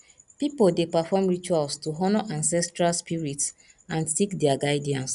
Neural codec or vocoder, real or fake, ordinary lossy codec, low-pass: none; real; none; 10.8 kHz